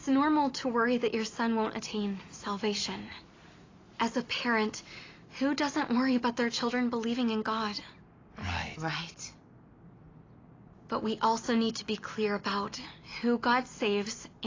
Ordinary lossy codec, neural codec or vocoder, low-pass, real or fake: AAC, 32 kbps; none; 7.2 kHz; real